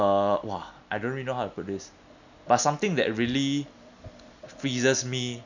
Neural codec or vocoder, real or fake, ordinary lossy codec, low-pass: none; real; none; 7.2 kHz